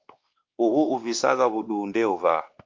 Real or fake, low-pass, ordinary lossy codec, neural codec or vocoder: fake; 7.2 kHz; Opus, 32 kbps; codec, 16 kHz, 2 kbps, X-Codec, WavLM features, trained on Multilingual LibriSpeech